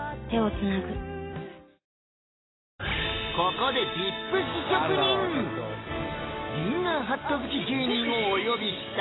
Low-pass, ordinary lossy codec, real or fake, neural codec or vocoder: 7.2 kHz; AAC, 16 kbps; real; none